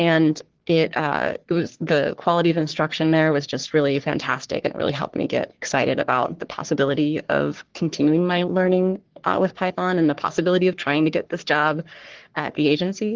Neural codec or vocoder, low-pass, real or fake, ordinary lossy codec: codec, 44.1 kHz, 3.4 kbps, Pupu-Codec; 7.2 kHz; fake; Opus, 16 kbps